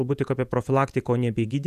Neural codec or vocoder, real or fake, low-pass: vocoder, 48 kHz, 128 mel bands, Vocos; fake; 14.4 kHz